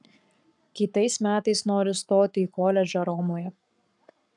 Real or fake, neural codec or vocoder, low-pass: fake; codec, 44.1 kHz, 7.8 kbps, Pupu-Codec; 10.8 kHz